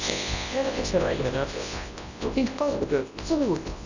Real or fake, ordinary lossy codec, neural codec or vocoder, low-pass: fake; none; codec, 24 kHz, 0.9 kbps, WavTokenizer, large speech release; 7.2 kHz